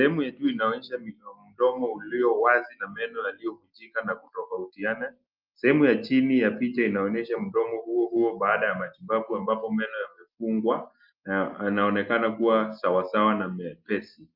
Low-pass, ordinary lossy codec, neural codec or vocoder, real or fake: 5.4 kHz; Opus, 24 kbps; none; real